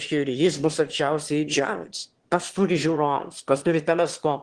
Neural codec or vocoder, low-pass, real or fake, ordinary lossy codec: autoencoder, 22.05 kHz, a latent of 192 numbers a frame, VITS, trained on one speaker; 9.9 kHz; fake; Opus, 16 kbps